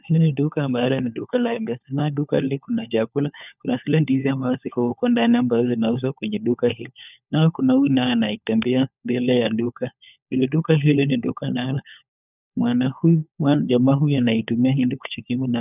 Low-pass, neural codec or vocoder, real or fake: 3.6 kHz; codec, 16 kHz, 4 kbps, FunCodec, trained on LibriTTS, 50 frames a second; fake